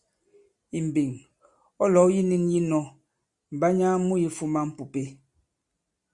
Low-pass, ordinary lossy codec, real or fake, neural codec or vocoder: 10.8 kHz; Opus, 64 kbps; real; none